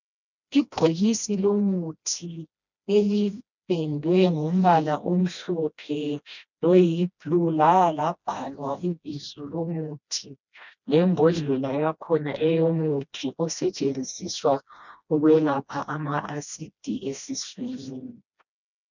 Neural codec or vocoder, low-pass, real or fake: codec, 16 kHz, 1 kbps, FreqCodec, smaller model; 7.2 kHz; fake